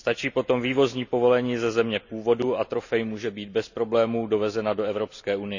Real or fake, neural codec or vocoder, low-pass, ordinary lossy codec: real; none; 7.2 kHz; none